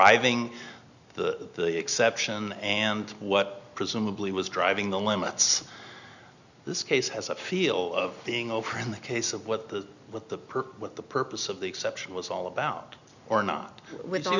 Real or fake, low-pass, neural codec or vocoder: real; 7.2 kHz; none